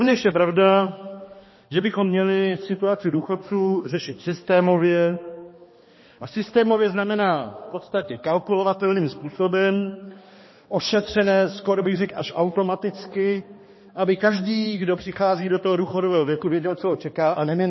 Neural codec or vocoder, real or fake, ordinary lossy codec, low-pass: codec, 16 kHz, 2 kbps, X-Codec, HuBERT features, trained on balanced general audio; fake; MP3, 24 kbps; 7.2 kHz